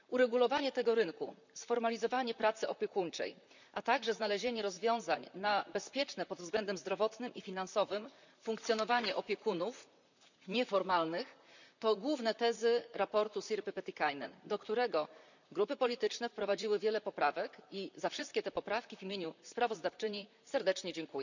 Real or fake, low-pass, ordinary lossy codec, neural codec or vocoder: fake; 7.2 kHz; none; vocoder, 44.1 kHz, 128 mel bands, Pupu-Vocoder